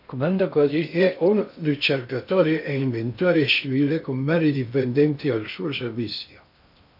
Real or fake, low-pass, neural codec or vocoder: fake; 5.4 kHz; codec, 16 kHz in and 24 kHz out, 0.6 kbps, FocalCodec, streaming, 2048 codes